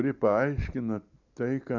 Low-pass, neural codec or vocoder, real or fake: 7.2 kHz; none; real